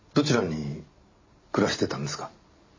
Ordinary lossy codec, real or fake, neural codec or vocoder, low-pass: MP3, 32 kbps; real; none; 7.2 kHz